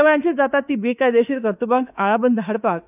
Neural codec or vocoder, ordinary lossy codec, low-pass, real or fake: autoencoder, 48 kHz, 32 numbers a frame, DAC-VAE, trained on Japanese speech; none; 3.6 kHz; fake